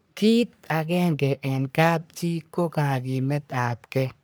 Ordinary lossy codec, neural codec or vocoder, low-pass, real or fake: none; codec, 44.1 kHz, 3.4 kbps, Pupu-Codec; none; fake